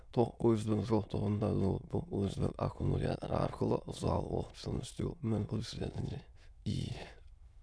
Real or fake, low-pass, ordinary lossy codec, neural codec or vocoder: fake; none; none; autoencoder, 22.05 kHz, a latent of 192 numbers a frame, VITS, trained on many speakers